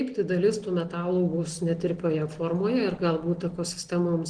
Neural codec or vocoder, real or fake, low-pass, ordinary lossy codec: none; real; 9.9 kHz; Opus, 16 kbps